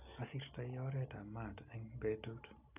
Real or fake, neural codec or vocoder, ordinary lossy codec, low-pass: real; none; none; 3.6 kHz